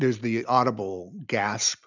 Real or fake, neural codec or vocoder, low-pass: real; none; 7.2 kHz